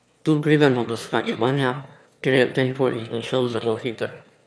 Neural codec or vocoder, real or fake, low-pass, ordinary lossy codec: autoencoder, 22.05 kHz, a latent of 192 numbers a frame, VITS, trained on one speaker; fake; none; none